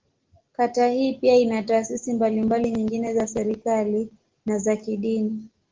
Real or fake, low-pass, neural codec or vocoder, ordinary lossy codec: real; 7.2 kHz; none; Opus, 16 kbps